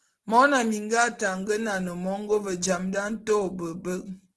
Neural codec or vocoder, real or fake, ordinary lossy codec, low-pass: none; real; Opus, 16 kbps; 10.8 kHz